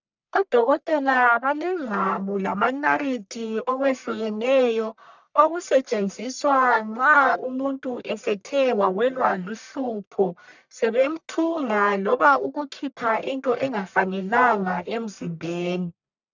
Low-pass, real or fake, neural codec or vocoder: 7.2 kHz; fake; codec, 44.1 kHz, 1.7 kbps, Pupu-Codec